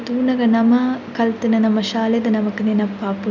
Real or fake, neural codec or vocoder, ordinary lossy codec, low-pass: real; none; none; 7.2 kHz